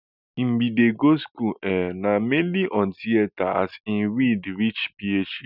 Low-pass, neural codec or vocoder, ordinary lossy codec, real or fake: 5.4 kHz; none; none; real